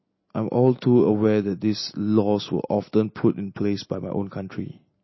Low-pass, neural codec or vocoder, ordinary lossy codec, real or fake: 7.2 kHz; none; MP3, 24 kbps; real